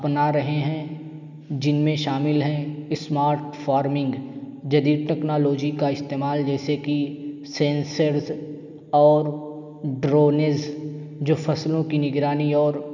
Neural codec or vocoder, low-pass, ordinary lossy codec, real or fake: none; 7.2 kHz; none; real